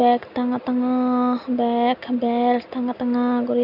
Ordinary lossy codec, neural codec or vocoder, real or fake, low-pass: none; none; real; 5.4 kHz